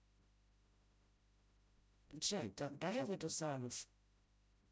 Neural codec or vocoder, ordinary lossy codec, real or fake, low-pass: codec, 16 kHz, 0.5 kbps, FreqCodec, smaller model; none; fake; none